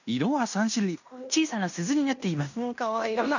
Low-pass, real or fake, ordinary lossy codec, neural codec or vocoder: 7.2 kHz; fake; none; codec, 16 kHz in and 24 kHz out, 0.9 kbps, LongCat-Audio-Codec, fine tuned four codebook decoder